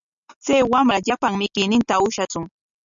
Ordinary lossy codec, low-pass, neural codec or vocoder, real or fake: MP3, 96 kbps; 7.2 kHz; none; real